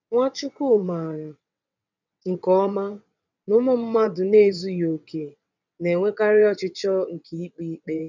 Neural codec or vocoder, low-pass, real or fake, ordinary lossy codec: vocoder, 22.05 kHz, 80 mel bands, WaveNeXt; 7.2 kHz; fake; none